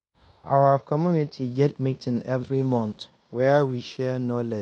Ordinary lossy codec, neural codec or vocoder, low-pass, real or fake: none; codec, 16 kHz in and 24 kHz out, 0.9 kbps, LongCat-Audio-Codec, fine tuned four codebook decoder; 10.8 kHz; fake